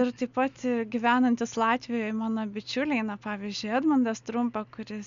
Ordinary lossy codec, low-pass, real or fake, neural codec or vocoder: MP3, 64 kbps; 7.2 kHz; real; none